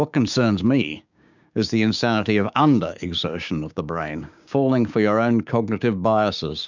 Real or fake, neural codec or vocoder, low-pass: fake; codec, 16 kHz, 6 kbps, DAC; 7.2 kHz